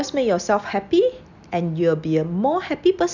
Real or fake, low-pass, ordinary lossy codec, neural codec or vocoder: real; 7.2 kHz; none; none